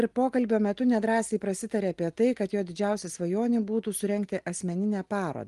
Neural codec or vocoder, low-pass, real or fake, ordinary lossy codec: none; 10.8 kHz; real; Opus, 24 kbps